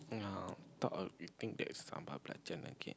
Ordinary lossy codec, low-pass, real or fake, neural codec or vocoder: none; none; real; none